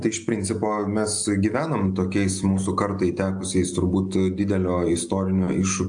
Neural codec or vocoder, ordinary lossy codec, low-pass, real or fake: none; AAC, 64 kbps; 9.9 kHz; real